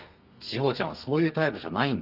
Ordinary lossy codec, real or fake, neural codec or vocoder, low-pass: Opus, 32 kbps; fake; codec, 32 kHz, 1.9 kbps, SNAC; 5.4 kHz